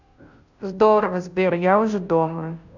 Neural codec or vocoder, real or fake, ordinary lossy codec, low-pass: codec, 16 kHz, 0.5 kbps, FunCodec, trained on Chinese and English, 25 frames a second; fake; none; 7.2 kHz